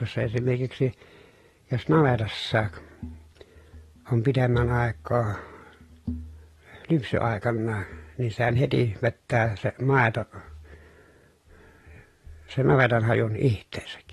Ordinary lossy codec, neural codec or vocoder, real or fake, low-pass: AAC, 32 kbps; none; real; 19.8 kHz